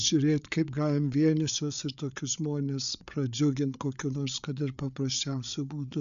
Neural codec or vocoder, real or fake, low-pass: codec, 16 kHz, 8 kbps, FreqCodec, larger model; fake; 7.2 kHz